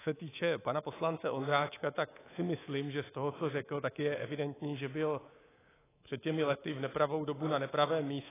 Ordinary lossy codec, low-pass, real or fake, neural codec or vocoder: AAC, 16 kbps; 3.6 kHz; fake; codec, 24 kHz, 3.1 kbps, DualCodec